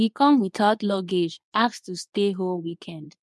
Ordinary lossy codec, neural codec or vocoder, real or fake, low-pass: none; codec, 24 kHz, 0.9 kbps, WavTokenizer, medium speech release version 2; fake; none